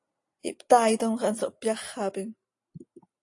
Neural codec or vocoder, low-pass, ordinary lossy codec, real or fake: none; 10.8 kHz; AAC, 48 kbps; real